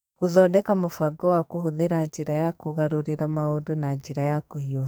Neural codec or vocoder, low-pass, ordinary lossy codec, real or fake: codec, 44.1 kHz, 2.6 kbps, SNAC; none; none; fake